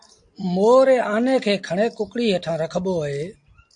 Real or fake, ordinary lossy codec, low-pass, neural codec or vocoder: real; AAC, 64 kbps; 9.9 kHz; none